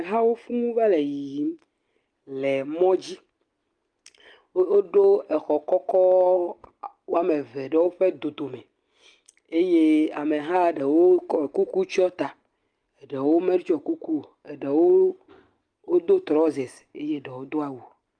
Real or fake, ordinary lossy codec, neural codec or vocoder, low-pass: real; Opus, 32 kbps; none; 9.9 kHz